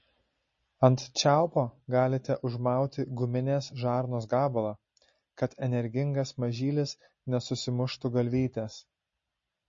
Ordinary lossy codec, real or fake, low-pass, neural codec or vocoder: MP3, 32 kbps; real; 7.2 kHz; none